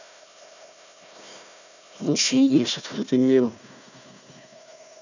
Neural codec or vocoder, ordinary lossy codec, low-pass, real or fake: codec, 16 kHz, 1 kbps, FunCodec, trained on Chinese and English, 50 frames a second; none; 7.2 kHz; fake